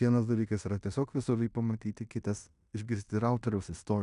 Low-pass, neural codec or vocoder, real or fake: 10.8 kHz; codec, 16 kHz in and 24 kHz out, 0.9 kbps, LongCat-Audio-Codec, fine tuned four codebook decoder; fake